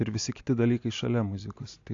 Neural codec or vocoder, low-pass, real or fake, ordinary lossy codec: none; 7.2 kHz; real; AAC, 48 kbps